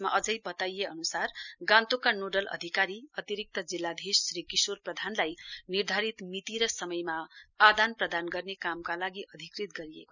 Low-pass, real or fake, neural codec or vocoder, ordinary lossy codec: 7.2 kHz; real; none; none